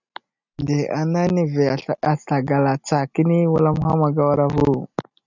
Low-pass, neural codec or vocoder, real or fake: 7.2 kHz; none; real